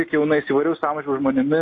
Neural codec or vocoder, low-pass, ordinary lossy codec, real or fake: none; 7.2 kHz; AAC, 32 kbps; real